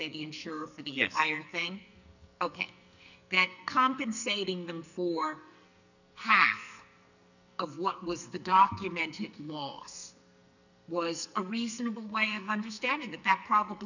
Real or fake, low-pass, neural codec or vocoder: fake; 7.2 kHz; codec, 44.1 kHz, 2.6 kbps, SNAC